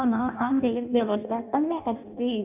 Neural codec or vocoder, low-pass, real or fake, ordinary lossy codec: codec, 16 kHz in and 24 kHz out, 0.6 kbps, FireRedTTS-2 codec; 3.6 kHz; fake; none